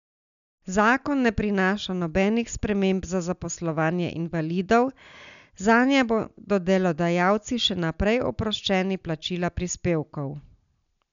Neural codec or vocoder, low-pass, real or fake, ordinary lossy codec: none; 7.2 kHz; real; none